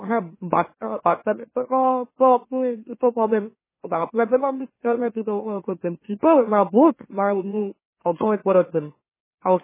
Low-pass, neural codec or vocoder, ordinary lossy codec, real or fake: 3.6 kHz; autoencoder, 44.1 kHz, a latent of 192 numbers a frame, MeloTTS; MP3, 16 kbps; fake